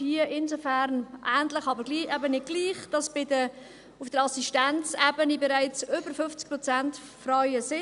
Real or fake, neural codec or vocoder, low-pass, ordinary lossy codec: real; none; 10.8 kHz; none